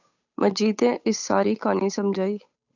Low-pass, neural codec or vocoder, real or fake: 7.2 kHz; codec, 16 kHz, 8 kbps, FunCodec, trained on Chinese and English, 25 frames a second; fake